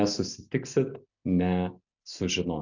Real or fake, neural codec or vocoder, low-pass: real; none; 7.2 kHz